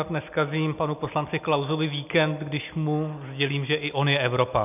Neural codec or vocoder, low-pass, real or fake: none; 3.6 kHz; real